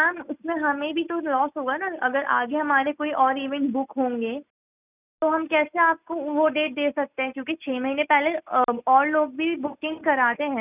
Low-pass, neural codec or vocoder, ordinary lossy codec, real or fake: 3.6 kHz; none; none; real